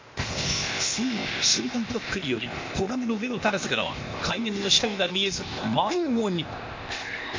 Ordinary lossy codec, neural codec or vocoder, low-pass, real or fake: MP3, 48 kbps; codec, 16 kHz, 0.8 kbps, ZipCodec; 7.2 kHz; fake